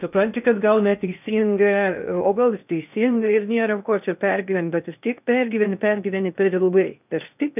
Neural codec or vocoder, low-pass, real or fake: codec, 16 kHz in and 24 kHz out, 0.6 kbps, FocalCodec, streaming, 2048 codes; 3.6 kHz; fake